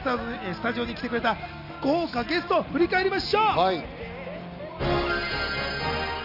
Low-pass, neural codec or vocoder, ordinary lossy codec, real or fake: 5.4 kHz; none; none; real